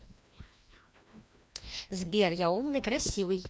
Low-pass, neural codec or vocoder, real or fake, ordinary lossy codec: none; codec, 16 kHz, 1 kbps, FreqCodec, larger model; fake; none